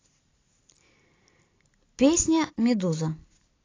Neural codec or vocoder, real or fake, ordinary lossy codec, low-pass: none; real; AAC, 32 kbps; 7.2 kHz